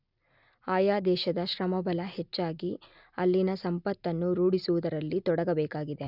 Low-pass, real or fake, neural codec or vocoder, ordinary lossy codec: 5.4 kHz; real; none; none